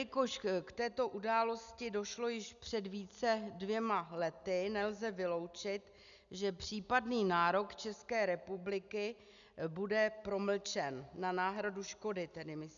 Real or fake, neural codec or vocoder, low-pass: real; none; 7.2 kHz